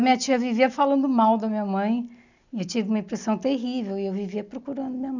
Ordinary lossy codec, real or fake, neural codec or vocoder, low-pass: none; real; none; 7.2 kHz